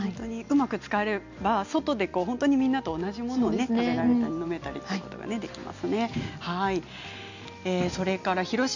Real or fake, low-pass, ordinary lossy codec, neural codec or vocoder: real; 7.2 kHz; none; none